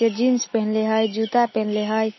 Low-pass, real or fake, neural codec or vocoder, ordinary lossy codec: 7.2 kHz; real; none; MP3, 24 kbps